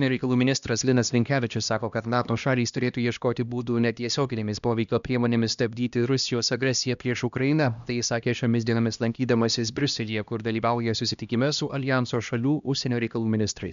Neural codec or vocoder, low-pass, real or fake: codec, 16 kHz, 1 kbps, X-Codec, HuBERT features, trained on LibriSpeech; 7.2 kHz; fake